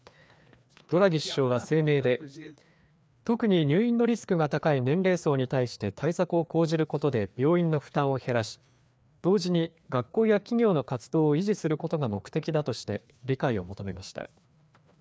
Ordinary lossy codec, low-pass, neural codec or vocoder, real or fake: none; none; codec, 16 kHz, 2 kbps, FreqCodec, larger model; fake